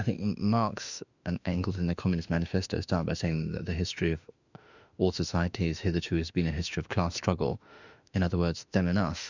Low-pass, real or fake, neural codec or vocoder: 7.2 kHz; fake; autoencoder, 48 kHz, 32 numbers a frame, DAC-VAE, trained on Japanese speech